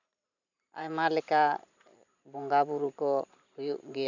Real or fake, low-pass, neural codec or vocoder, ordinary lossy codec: fake; 7.2 kHz; vocoder, 44.1 kHz, 128 mel bands every 512 samples, BigVGAN v2; none